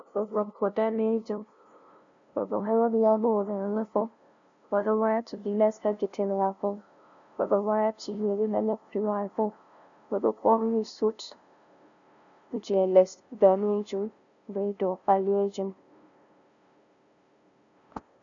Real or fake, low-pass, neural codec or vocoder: fake; 7.2 kHz; codec, 16 kHz, 0.5 kbps, FunCodec, trained on LibriTTS, 25 frames a second